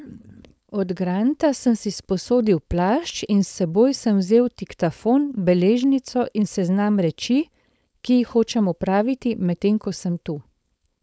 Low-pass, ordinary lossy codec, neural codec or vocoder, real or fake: none; none; codec, 16 kHz, 4.8 kbps, FACodec; fake